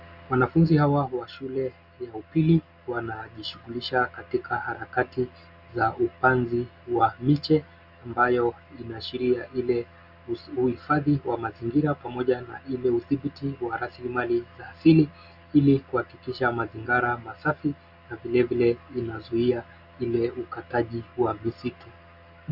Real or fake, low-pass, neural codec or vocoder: real; 5.4 kHz; none